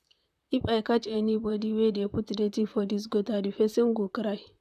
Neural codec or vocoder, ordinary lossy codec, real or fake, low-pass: vocoder, 44.1 kHz, 128 mel bands, Pupu-Vocoder; none; fake; 14.4 kHz